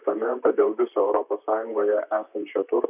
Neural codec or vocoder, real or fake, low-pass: vocoder, 44.1 kHz, 128 mel bands, Pupu-Vocoder; fake; 3.6 kHz